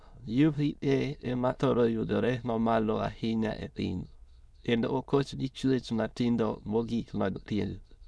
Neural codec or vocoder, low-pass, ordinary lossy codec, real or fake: autoencoder, 22.05 kHz, a latent of 192 numbers a frame, VITS, trained on many speakers; none; none; fake